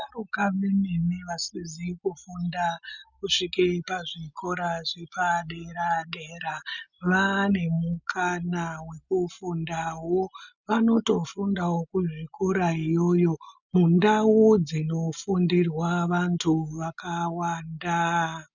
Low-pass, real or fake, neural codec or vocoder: 7.2 kHz; real; none